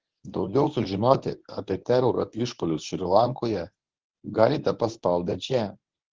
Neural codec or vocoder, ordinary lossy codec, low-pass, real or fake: codec, 24 kHz, 0.9 kbps, WavTokenizer, medium speech release version 1; Opus, 16 kbps; 7.2 kHz; fake